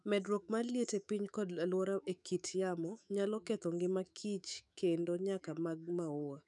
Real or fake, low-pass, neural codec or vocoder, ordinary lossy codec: fake; 10.8 kHz; autoencoder, 48 kHz, 128 numbers a frame, DAC-VAE, trained on Japanese speech; none